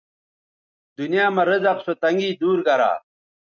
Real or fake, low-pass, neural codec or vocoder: real; 7.2 kHz; none